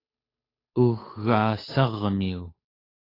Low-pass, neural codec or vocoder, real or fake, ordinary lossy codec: 5.4 kHz; codec, 16 kHz, 8 kbps, FunCodec, trained on Chinese and English, 25 frames a second; fake; AAC, 24 kbps